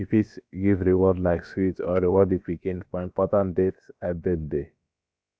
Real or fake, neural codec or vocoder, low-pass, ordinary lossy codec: fake; codec, 16 kHz, about 1 kbps, DyCAST, with the encoder's durations; none; none